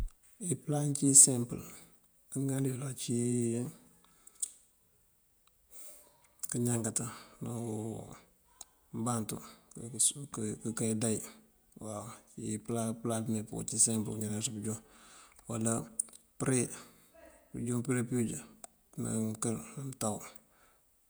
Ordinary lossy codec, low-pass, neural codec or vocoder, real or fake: none; none; vocoder, 48 kHz, 128 mel bands, Vocos; fake